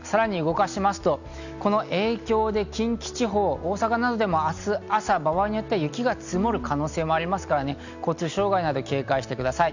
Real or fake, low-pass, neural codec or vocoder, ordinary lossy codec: real; 7.2 kHz; none; none